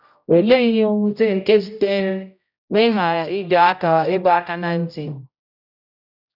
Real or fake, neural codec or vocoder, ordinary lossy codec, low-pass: fake; codec, 16 kHz, 0.5 kbps, X-Codec, HuBERT features, trained on general audio; none; 5.4 kHz